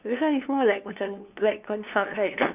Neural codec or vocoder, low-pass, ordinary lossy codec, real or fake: codec, 16 kHz, 2 kbps, FunCodec, trained on LibriTTS, 25 frames a second; 3.6 kHz; none; fake